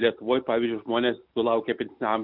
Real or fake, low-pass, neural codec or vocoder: real; 5.4 kHz; none